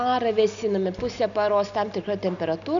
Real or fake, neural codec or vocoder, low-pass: real; none; 7.2 kHz